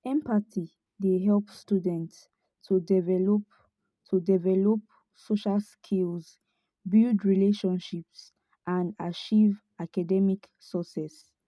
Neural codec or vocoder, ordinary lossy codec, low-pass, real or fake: none; none; none; real